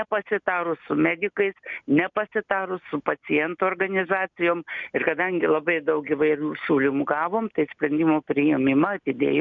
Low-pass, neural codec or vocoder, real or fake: 7.2 kHz; none; real